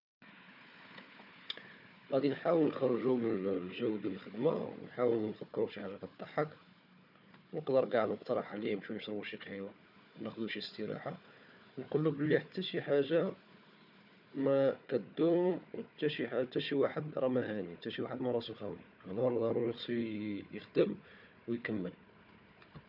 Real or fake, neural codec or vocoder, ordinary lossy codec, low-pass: fake; codec, 16 kHz, 4 kbps, FunCodec, trained on Chinese and English, 50 frames a second; none; 5.4 kHz